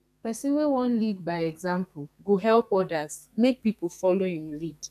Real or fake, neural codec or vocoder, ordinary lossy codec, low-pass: fake; codec, 32 kHz, 1.9 kbps, SNAC; none; 14.4 kHz